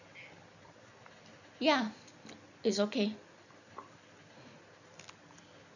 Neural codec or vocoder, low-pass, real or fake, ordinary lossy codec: none; 7.2 kHz; real; none